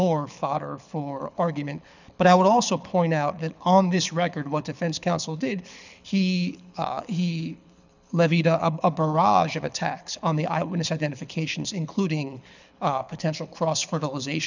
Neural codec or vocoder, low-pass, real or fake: codec, 24 kHz, 6 kbps, HILCodec; 7.2 kHz; fake